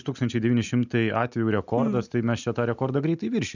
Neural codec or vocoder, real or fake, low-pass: none; real; 7.2 kHz